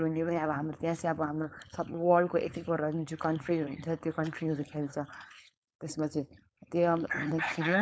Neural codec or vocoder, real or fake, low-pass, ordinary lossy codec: codec, 16 kHz, 4.8 kbps, FACodec; fake; none; none